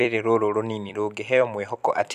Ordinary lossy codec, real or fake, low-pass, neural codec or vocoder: none; fake; 14.4 kHz; vocoder, 44.1 kHz, 128 mel bands every 256 samples, BigVGAN v2